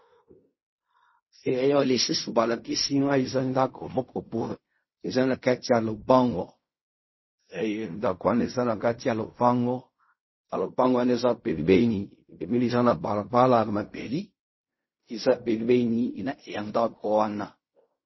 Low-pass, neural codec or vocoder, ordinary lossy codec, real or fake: 7.2 kHz; codec, 16 kHz in and 24 kHz out, 0.4 kbps, LongCat-Audio-Codec, fine tuned four codebook decoder; MP3, 24 kbps; fake